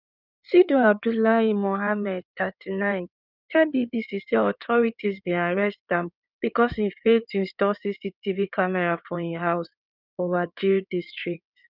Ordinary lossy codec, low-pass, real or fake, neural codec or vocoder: none; 5.4 kHz; fake; codec, 16 kHz in and 24 kHz out, 2.2 kbps, FireRedTTS-2 codec